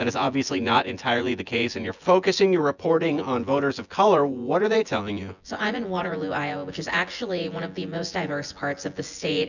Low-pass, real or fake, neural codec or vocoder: 7.2 kHz; fake; vocoder, 24 kHz, 100 mel bands, Vocos